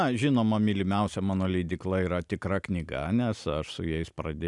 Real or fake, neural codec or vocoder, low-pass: real; none; 10.8 kHz